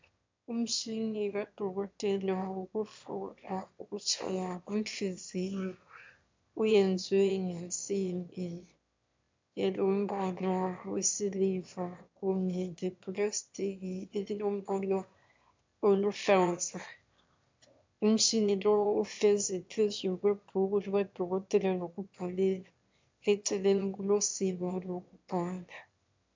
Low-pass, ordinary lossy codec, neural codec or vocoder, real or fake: 7.2 kHz; MP3, 64 kbps; autoencoder, 22.05 kHz, a latent of 192 numbers a frame, VITS, trained on one speaker; fake